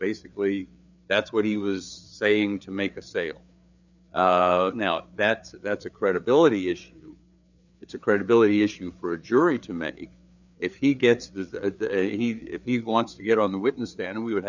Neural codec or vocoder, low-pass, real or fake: codec, 16 kHz, 4 kbps, FreqCodec, larger model; 7.2 kHz; fake